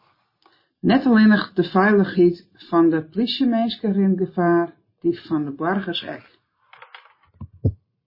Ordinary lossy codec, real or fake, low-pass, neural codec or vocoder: MP3, 24 kbps; real; 5.4 kHz; none